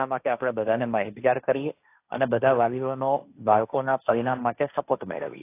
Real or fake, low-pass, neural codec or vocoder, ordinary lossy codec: fake; 3.6 kHz; codec, 16 kHz, 1.1 kbps, Voila-Tokenizer; AAC, 24 kbps